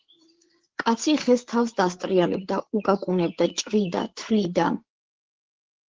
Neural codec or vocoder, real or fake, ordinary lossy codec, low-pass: codec, 16 kHz, 8 kbps, FreqCodec, larger model; fake; Opus, 16 kbps; 7.2 kHz